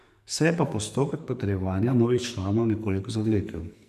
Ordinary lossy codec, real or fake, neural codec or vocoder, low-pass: none; fake; codec, 44.1 kHz, 2.6 kbps, SNAC; 14.4 kHz